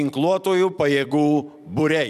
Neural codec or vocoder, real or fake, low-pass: vocoder, 44.1 kHz, 128 mel bands every 256 samples, BigVGAN v2; fake; 14.4 kHz